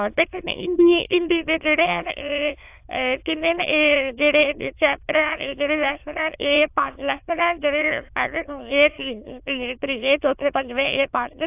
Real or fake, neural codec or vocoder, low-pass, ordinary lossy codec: fake; autoencoder, 22.05 kHz, a latent of 192 numbers a frame, VITS, trained on many speakers; 3.6 kHz; AAC, 32 kbps